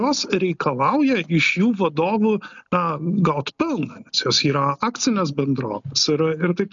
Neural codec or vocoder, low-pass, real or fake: none; 7.2 kHz; real